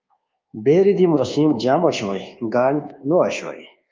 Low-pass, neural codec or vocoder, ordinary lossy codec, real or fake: 7.2 kHz; codec, 24 kHz, 1.2 kbps, DualCodec; Opus, 32 kbps; fake